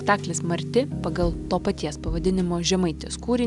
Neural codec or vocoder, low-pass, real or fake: none; 10.8 kHz; real